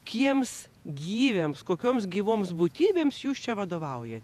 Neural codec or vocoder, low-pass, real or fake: vocoder, 48 kHz, 128 mel bands, Vocos; 14.4 kHz; fake